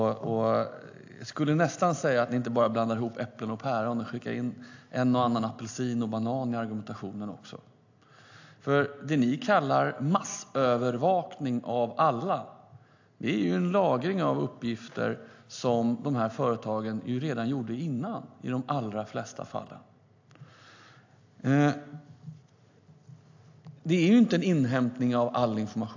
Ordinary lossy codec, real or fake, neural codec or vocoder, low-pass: AAC, 48 kbps; real; none; 7.2 kHz